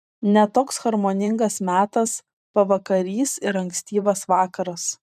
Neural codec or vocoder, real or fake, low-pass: vocoder, 44.1 kHz, 128 mel bands every 512 samples, BigVGAN v2; fake; 14.4 kHz